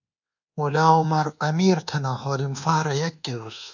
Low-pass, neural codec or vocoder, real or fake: 7.2 kHz; codec, 24 kHz, 1.2 kbps, DualCodec; fake